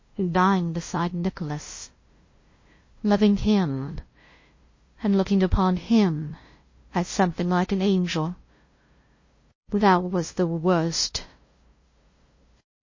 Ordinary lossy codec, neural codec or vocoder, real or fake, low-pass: MP3, 32 kbps; codec, 16 kHz, 0.5 kbps, FunCodec, trained on LibriTTS, 25 frames a second; fake; 7.2 kHz